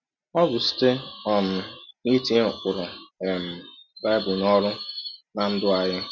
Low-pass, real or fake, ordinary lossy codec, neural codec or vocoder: 7.2 kHz; real; none; none